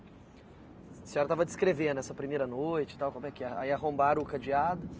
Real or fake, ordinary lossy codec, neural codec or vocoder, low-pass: real; none; none; none